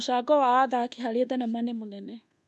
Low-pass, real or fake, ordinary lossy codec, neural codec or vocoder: none; fake; none; codec, 24 kHz, 1.2 kbps, DualCodec